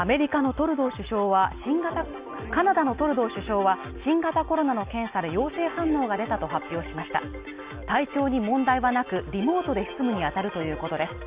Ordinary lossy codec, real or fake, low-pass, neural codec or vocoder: Opus, 64 kbps; real; 3.6 kHz; none